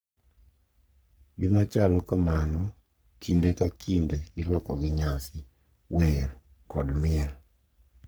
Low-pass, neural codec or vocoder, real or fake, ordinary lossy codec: none; codec, 44.1 kHz, 3.4 kbps, Pupu-Codec; fake; none